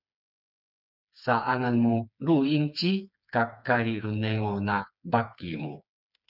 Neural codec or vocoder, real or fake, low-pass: codec, 16 kHz, 4 kbps, FreqCodec, smaller model; fake; 5.4 kHz